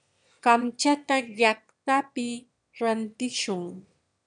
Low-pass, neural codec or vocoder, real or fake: 9.9 kHz; autoencoder, 22.05 kHz, a latent of 192 numbers a frame, VITS, trained on one speaker; fake